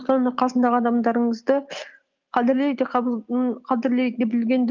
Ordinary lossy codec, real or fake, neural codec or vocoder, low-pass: Opus, 24 kbps; real; none; 7.2 kHz